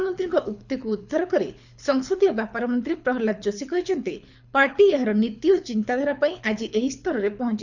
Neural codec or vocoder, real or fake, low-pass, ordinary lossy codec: codec, 24 kHz, 6 kbps, HILCodec; fake; 7.2 kHz; none